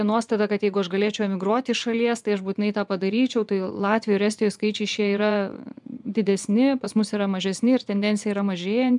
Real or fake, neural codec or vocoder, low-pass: fake; vocoder, 24 kHz, 100 mel bands, Vocos; 10.8 kHz